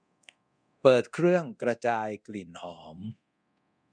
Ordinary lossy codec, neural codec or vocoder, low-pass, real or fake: none; codec, 24 kHz, 0.9 kbps, DualCodec; 9.9 kHz; fake